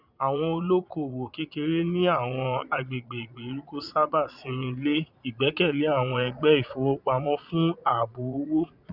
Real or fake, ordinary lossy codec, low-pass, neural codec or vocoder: fake; none; 5.4 kHz; vocoder, 22.05 kHz, 80 mel bands, Vocos